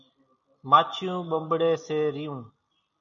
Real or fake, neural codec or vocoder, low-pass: real; none; 7.2 kHz